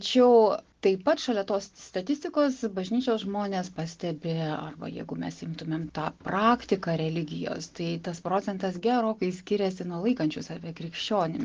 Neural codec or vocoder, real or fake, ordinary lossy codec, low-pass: none; real; Opus, 16 kbps; 7.2 kHz